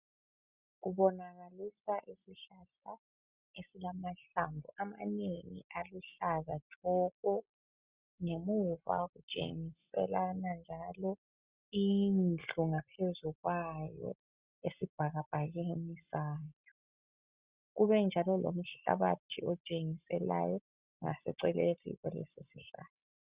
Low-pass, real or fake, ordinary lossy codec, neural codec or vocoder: 3.6 kHz; real; Opus, 64 kbps; none